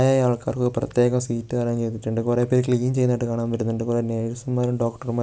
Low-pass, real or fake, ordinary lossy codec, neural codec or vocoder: none; real; none; none